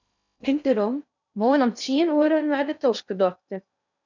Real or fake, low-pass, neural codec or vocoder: fake; 7.2 kHz; codec, 16 kHz in and 24 kHz out, 0.6 kbps, FocalCodec, streaming, 2048 codes